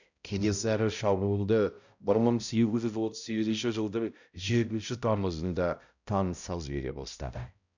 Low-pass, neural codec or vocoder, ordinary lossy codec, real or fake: 7.2 kHz; codec, 16 kHz, 0.5 kbps, X-Codec, HuBERT features, trained on balanced general audio; none; fake